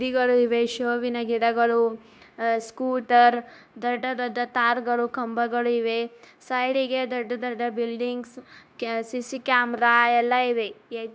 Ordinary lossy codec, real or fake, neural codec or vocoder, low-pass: none; fake; codec, 16 kHz, 0.9 kbps, LongCat-Audio-Codec; none